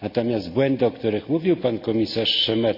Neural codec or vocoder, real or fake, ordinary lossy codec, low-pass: none; real; none; 5.4 kHz